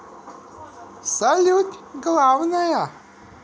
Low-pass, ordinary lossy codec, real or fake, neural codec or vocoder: none; none; real; none